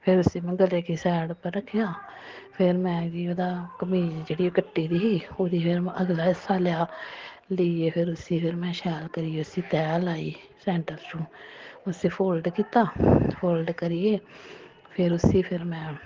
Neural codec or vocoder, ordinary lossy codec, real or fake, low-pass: none; Opus, 16 kbps; real; 7.2 kHz